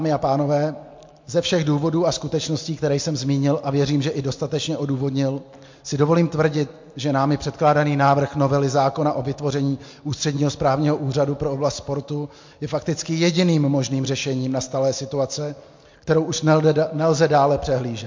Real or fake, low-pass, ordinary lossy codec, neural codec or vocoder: real; 7.2 kHz; MP3, 48 kbps; none